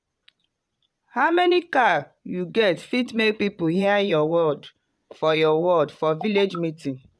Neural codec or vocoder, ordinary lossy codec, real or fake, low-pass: vocoder, 22.05 kHz, 80 mel bands, Vocos; none; fake; none